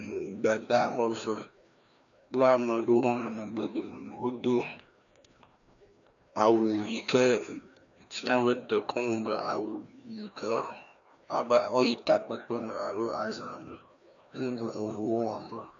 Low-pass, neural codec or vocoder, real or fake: 7.2 kHz; codec, 16 kHz, 1 kbps, FreqCodec, larger model; fake